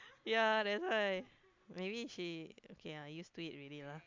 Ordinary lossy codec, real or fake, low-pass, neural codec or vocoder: MP3, 64 kbps; real; 7.2 kHz; none